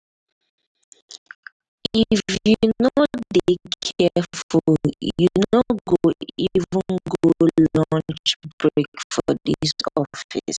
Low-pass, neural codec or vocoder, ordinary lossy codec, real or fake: 9.9 kHz; none; none; real